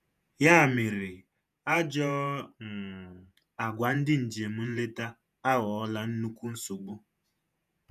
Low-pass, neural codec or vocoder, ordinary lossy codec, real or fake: 14.4 kHz; vocoder, 48 kHz, 128 mel bands, Vocos; none; fake